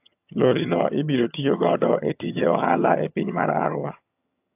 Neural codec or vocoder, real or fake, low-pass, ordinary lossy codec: vocoder, 22.05 kHz, 80 mel bands, HiFi-GAN; fake; 3.6 kHz; AAC, 32 kbps